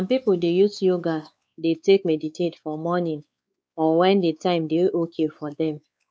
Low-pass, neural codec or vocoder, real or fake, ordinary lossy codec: none; codec, 16 kHz, 4 kbps, X-Codec, WavLM features, trained on Multilingual LibriSpeech; fake; none